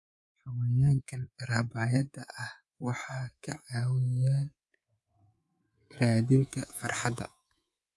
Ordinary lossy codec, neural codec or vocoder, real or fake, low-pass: none; codec, 24 kHz, 3.1 kbps, DualCodec; fake; none